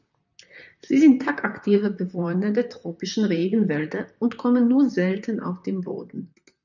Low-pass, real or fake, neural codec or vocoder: 7.2 kHz; fake; vocoder, 44.1 kHz, 128 mel bands, Pupu-Vocoder